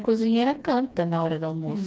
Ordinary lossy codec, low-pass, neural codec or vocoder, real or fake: none; none; codec, 16 kHz, 2 kbps, FreqCodec, smaller model; fake